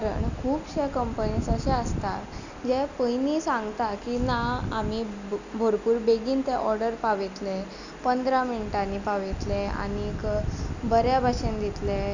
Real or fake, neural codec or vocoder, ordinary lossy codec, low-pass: real; none; none; 7.2 kHz